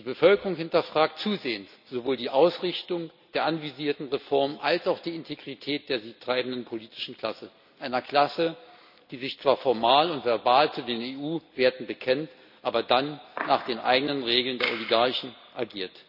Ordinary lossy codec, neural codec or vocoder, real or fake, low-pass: none; none; real; 5.4 kHz